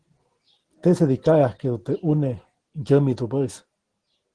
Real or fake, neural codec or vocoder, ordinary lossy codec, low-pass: real; none; Opus, 16 kbps; 9.9 kHz